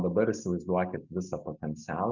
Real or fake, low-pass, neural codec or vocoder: real; 7.2 kHz; none